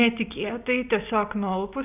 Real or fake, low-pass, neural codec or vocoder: real; 3.6 kHz; none